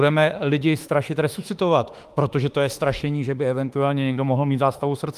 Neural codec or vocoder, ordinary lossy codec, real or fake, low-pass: autoencoder, 48 kHz, 32 numbers a frame, DAC-VAE, trained on Japanese speech; Opus, 32 kbps; fake; 14.4 kHz